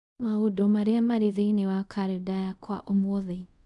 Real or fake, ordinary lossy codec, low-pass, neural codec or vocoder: fake; none; 10.8 kHz; codec, 24 kHz, 0.5 kbps, DualCodec